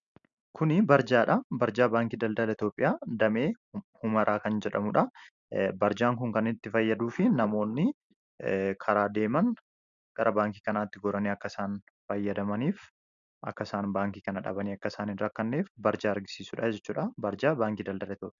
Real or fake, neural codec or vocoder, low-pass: real; none; 7.2 kHz